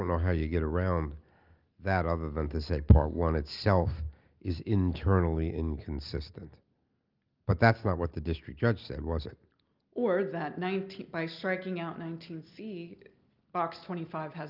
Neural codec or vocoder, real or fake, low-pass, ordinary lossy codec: none; real; 5.4 kHz; Opus, 32 kbps